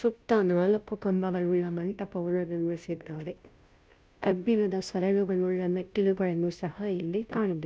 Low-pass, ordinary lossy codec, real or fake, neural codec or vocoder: none; none; fake; codec, 16 kHz, 0.5 kbps, FunCodec, trained on Chinese and English, 25 frames a second